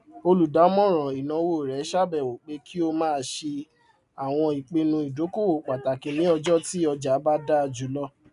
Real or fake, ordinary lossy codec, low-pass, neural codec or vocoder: real; none; 10.8 kHz; none